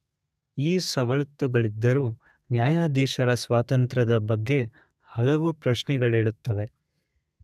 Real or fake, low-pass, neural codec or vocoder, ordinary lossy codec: fake; 14.4 kHz; codec, 32 kHz, 1.9 kbps, SNAC; none